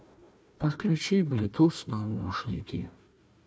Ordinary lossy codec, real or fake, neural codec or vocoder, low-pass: none; fake; codec, 16 kHz, 1 kbps, FunCodec, trained on Chinese and English, 50 frames a second; none